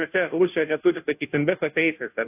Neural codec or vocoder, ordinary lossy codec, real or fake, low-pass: codec, 16 kHz, 0.5 kbps, FunCodec, trained on Chinese and English, 25 frames a second; AAC, 32 kbps; fake; 3.6 kHz